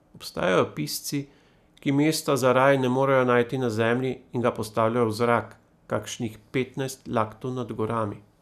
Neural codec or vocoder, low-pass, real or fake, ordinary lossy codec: none; 14.4 kHz; real; none